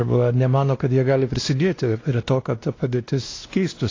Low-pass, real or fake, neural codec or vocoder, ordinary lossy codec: 7.2 kHz; fake; codec, 16 kHz, 1 kbps, X-Codec, WavLM features, trained on Multilingual LibriSpeech; AAC, 32 kbps